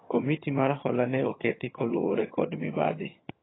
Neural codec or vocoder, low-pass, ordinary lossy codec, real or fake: vocoder, 22.05 kHz, 80 mel bands, HiFi-GAN; 7.2 kHz; AAC, 16 kbps; fake